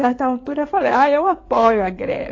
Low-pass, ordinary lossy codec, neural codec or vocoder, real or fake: 7.2 kHz; AAC, 32 kbps; codec, 16 kHz in and 24 kHz out, 2.2 kbps, FireRedTTS-2 codec; fake